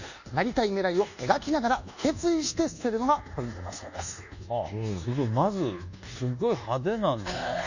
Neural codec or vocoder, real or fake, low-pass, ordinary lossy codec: codec, 24 kHz, 1.2 kbps, DualCodec; fake; 7.2 kHz; none